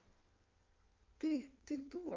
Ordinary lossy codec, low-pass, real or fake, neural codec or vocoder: Opus, 24 kbps; 7.2 kHz; fake; codec, 16 kHz in and 24 kHz out, 1.1 kbps, FireRedTTS-2 codec